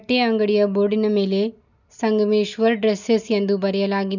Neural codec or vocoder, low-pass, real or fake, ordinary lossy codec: none; 7.2 kHz; real; none